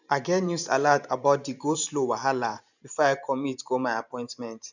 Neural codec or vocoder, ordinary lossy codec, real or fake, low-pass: vocoder, 44.1 kHz, 128 mel bands every 512 samples, BigVGAN v2; none; fake; 7.2 kHz